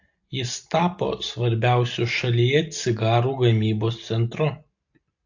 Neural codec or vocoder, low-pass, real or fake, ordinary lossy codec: none; 7.2 kHz; real; AAC, 48 kbps